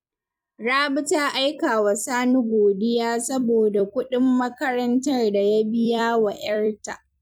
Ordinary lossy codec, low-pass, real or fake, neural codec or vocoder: none; 19.8 kHz; fake; vocoder, 44.1 kHz, 128 mel bands every 256 samples, BigVGAN v2